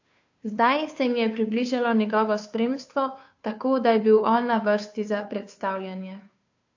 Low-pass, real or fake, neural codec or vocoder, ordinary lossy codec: 7.2 kHz; fake; codec, 16 kHz, 2 kbps, FunCodec, trained on Chinese and English, 25 frames a second; AAC, 48 kbps